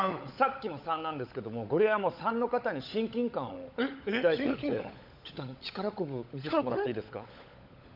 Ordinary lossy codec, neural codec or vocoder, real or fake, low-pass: none; codec, 16 kHz, 16 kbps, FunCodec, trained on LibriTTS, 50 frames a second; fake; 5.4 kHz